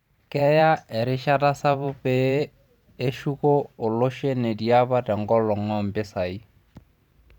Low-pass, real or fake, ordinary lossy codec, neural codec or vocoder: 19.8 kHz; fake; none; vocoder, 44.1 kHz, 128 mel bands every 512 samples, BigVGAN v2